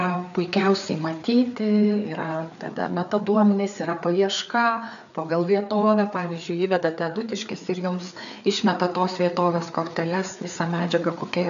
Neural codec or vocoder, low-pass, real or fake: codec, 16 kHz, 4 kbps, FreqCodec, larger model; 7.2 kHz; fake